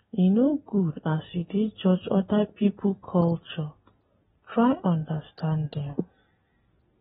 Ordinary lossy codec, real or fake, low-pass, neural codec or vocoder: AAC, 16 kbps; real; 10.8 kHz; none